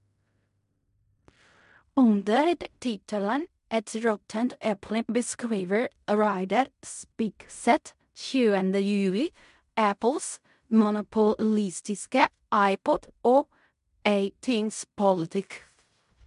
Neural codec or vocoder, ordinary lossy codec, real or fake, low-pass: codec, 16 kHz in and 24 kHz out, 0.4 kbps, LongCat-Audio-Codec, fine tuned four codebook decoder; MP3, 64 kbps; fake; 10.8 kHz